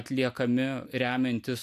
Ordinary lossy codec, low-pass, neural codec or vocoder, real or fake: MP3, 96 kbps; 14.4 kHz; none; real